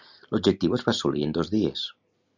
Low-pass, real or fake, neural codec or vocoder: 7.2 kHz; real; none